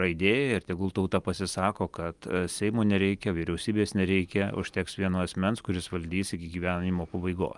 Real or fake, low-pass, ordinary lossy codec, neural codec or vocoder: real; 10.8 kHz; Opus, 32 kbps; none